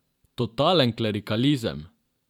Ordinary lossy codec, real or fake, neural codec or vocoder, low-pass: none; fake; vocoder, 44.1 kHz, 128 mel bands every 512 samples, BigVGAN v2; 19.8 kHz